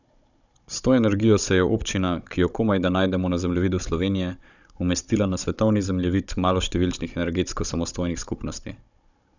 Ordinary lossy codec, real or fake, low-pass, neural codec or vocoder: none; fake; 7.2 kHz; codec, 16 kHz, 16 kbps, FunCodec, trained on Chinese and English, 50 frames a second